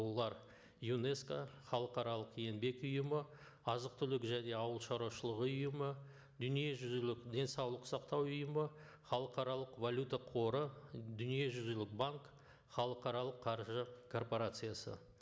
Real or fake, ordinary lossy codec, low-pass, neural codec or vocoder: real; none; none; none